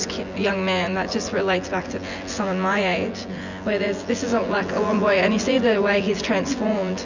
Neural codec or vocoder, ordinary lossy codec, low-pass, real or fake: vocoder, 24 kHz, 100 mel bands, Vocos; Opus, 64 kbps; 7.2 kHz; fake